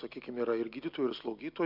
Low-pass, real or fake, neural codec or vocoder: 5.4 kHz; real; none